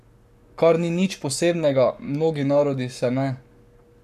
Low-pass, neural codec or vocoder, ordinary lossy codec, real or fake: 14.4 kHz; codec, 44.1 kHz, 7.8 kbps, Pupu-Codec; none; fake